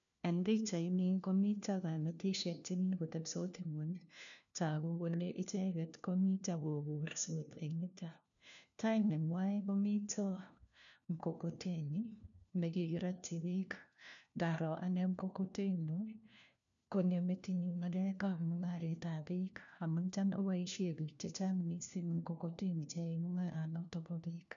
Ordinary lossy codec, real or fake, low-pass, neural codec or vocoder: none; fake; 7.2 kHz; codec, 16 kHz, 1 kbps, FunCodec, trained on LibriTTS, 50 frames a second